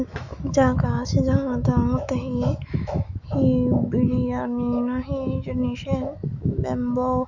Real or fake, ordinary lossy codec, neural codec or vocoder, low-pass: fake; Opus, 64 kbps; autoencoder, 48 kHz, 128 numbers a frame, DAC-VAE, trained on Japanese speech; 7.2 kHz